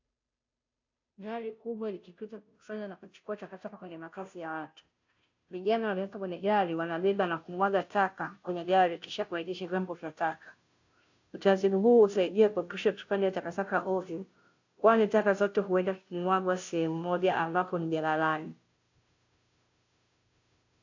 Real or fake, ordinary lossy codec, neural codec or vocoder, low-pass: fake; AAC, 48 kbps; codec, 16 kHz, 0.5 kbps, FunCodec, trained on Chinese and English, 25 frames a second; 7.2 kHz